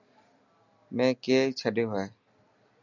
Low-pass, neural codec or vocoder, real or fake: 7.2 kHz; none; real